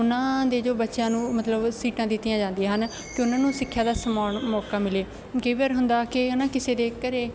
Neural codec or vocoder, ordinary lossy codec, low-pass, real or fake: none; none; none; real